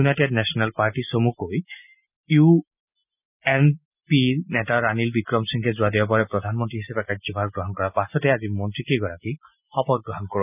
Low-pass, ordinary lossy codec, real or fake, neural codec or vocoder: 3.6 kHz; none; real; none